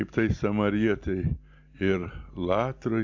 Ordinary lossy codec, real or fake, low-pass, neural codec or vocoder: MP3, 64 kbps; real; 7.2 kHz; none